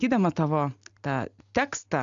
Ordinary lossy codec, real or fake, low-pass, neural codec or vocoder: AAC, 64 kbps; real; 7.2 kHz; none